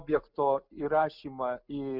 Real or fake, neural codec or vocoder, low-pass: real; none; 5.4 kHz